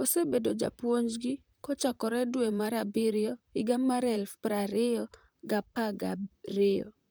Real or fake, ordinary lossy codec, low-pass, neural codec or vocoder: fake; none; none; vocoder, 44.1 kHz, 128 mel bands, Pupu-Vocoder